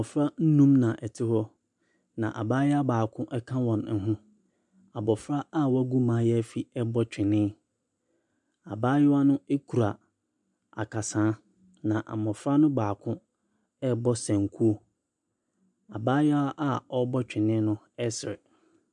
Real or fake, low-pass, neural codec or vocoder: real; 10.8 kHz; none